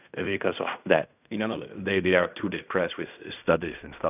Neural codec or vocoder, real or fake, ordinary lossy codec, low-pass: codec, 16 kHz in and 24 kHz out, 0.4 kbps, LongCat-Audio-Codec, fine tuned four codebook decoder; fake; none; 3.6 kHz